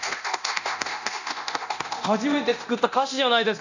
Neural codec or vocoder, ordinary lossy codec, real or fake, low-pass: codec, 24 kHz, 0.9 kbps, DualCodec; none; fake; 7.2 kHz